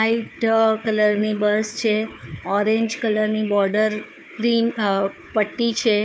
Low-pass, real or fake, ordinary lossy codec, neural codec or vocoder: none; fake; none; codec, 16 kHz, 4 kbps, FunCodec, trained on Chinese and English, 50 frames a second